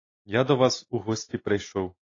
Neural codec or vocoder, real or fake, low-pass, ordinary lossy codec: none; real; 7.2 kHz; AAC, 32 kbps